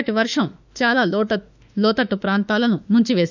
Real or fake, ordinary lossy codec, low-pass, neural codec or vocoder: fake; none; 7.2 kHz; autoencoder, 48 kHz, 32 numbers a frame, DAC-VAE, trained on Japanese speech